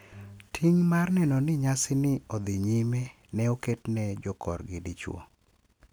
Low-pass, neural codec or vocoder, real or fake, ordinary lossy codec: none; none; real; none